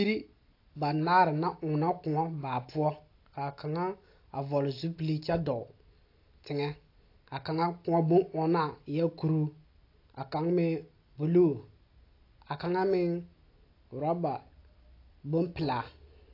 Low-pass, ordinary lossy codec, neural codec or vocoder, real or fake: 5.4 kHz; AAC, 32 kbps; none; real